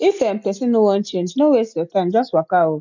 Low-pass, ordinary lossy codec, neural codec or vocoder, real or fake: 7.2 kHz; none; none; real